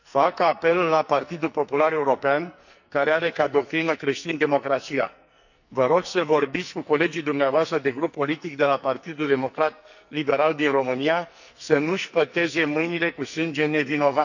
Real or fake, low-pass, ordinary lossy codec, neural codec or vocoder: fake; 7.2 kHz; none; codec, 44.1 kHz, 2.6 kbps, SNAC